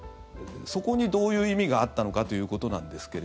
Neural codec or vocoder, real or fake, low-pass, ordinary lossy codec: none; real; none; none